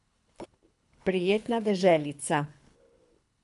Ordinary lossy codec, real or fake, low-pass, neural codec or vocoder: none; fake; 10.8 kHz; codec, 24 kHz, 3 kbps, HILCodec